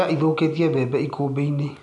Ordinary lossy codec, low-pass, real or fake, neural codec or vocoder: none; 10.8 kHz; real; none